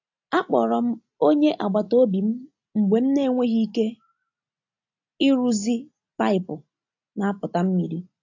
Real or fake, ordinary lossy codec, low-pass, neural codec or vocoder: real; AAC, 48 kbps; 7.2 kHz; none